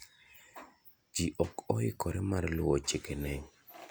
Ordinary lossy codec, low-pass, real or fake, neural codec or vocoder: none; none; real; none